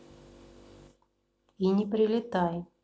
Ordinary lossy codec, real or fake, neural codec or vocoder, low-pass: none; real; none; none